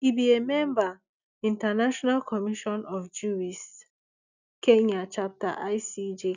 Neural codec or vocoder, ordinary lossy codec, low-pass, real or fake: none; none; 7.2 kHz; real